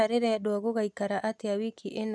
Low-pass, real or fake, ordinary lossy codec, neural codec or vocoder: none; real; none; none